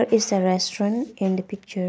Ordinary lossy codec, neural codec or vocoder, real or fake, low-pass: none; none; real; none